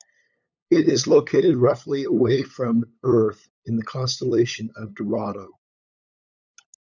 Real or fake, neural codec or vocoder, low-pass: fake; codec, 16 kHz, 8 kbps, FunCodec, trained on LibriTTS, 25 frames a second; 7.2 kHz